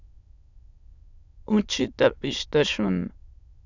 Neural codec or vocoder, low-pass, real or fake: autoencoder, 22.05 kHz, a latent of 192 numbers a frame, VITS, trained on many speakers; 7.2 kHz; fake